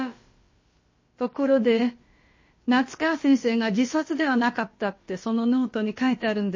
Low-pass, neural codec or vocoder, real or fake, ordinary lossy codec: 7.2 kHz; codec, 16 kHz, about 1 kbps, DyCAST, with the encoder's durations; fake; MP3, 32 kbps